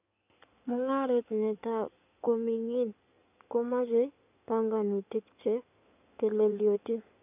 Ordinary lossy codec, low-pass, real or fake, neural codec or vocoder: none; 3.6 kHz; fake; codec, 16 kHz in and 24 kHz out, 2.2 kbps, FireRedTTS-2 codec